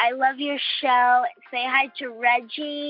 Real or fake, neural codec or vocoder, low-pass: real; none; 5.4 kHz